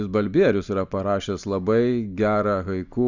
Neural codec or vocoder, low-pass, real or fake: none; 7.2 kHz; real